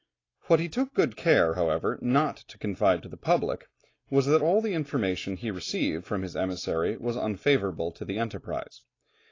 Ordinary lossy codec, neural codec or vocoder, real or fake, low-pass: AAC, 32 kbps; none; real; 7.2 kHz